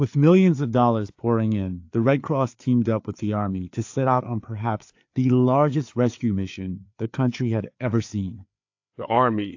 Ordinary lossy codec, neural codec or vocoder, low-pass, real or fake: AAC, 48 kbps; codec, 16 kHz, 4 kbps, FreqCodec, larger model; 7.2 kHz; fake